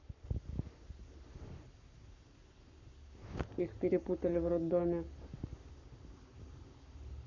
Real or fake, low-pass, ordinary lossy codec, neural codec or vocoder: fake; 7.2 kHz; none; codec, 44.1 kHz, 7.8 kbps, Pupu-Codec